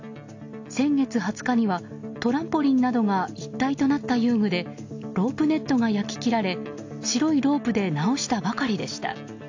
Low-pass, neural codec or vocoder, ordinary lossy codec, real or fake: 7.2 kHz; none; AAC, 48 kbps; real